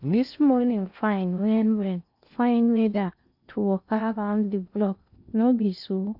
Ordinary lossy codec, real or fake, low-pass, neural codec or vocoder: none; fake; 5.4 kHz; codec, 16 kHz in and 24 kHz out, 0.8 kbps, FocalCodec, streaming, 65536 codes